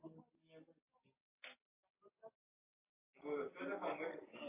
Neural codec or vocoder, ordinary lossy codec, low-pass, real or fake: none; AAC, 32 kbps; 3.6 kHz; real